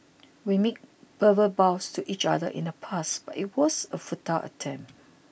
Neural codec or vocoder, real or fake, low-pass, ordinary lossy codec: none; real; none; none